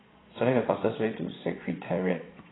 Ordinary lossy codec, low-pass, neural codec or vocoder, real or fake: AAC, 16 kbps; 7.2 kHz; vocoder, 22.05 kHz, 80 mel bands, Vocos; fake